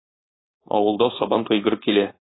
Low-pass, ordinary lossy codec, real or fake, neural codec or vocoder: 7.2 kHz; AAC, 16 kbps; fake; codec, 16 kHz, 4.8 kbps, FACodec